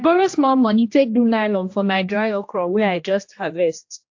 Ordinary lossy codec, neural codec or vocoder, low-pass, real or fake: AAC, 48 kbps; codec, 16 kHz, 1 kbps, X-Codec, HuBERT features, trained on general audio; 7.2 kHz; fake